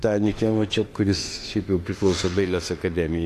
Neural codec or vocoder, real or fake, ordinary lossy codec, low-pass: autoencoder, 48 kHz, 32 numbers a frame, DAC-VAE, trained on Japanese speech; fake; AAC, 48 kbps; 14.4 kHz